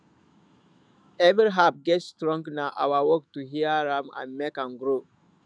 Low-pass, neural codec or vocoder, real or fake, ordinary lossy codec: 9.9 kHz; autoencoder, 48 kHz, 128 numbers a frame, DAC-VAE, trained on Japanese speech; fake; none